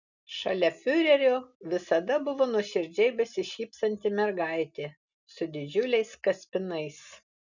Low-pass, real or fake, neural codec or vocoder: 7.2 kHz; real; none